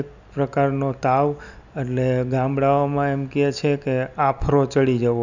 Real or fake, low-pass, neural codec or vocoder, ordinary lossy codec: real; 7.2 kHz; none; none